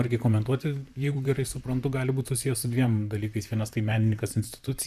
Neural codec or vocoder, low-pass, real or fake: vocoder, 44.1 kHz, 128 mel bands, Pupu-Vocoder; 14.4 kHz; fake